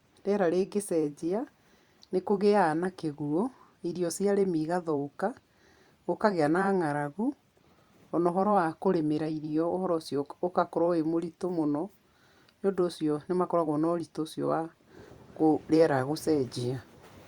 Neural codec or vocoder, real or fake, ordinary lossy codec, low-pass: vocoder, 44.1 kHz, 128 mel bands every 512 samples, BigVGAN v2; fake; Opus, 64 kbps; 19.8 kHz